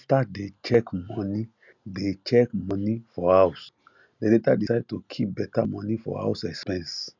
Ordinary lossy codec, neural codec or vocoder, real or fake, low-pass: none; none; real; 7.2 kHz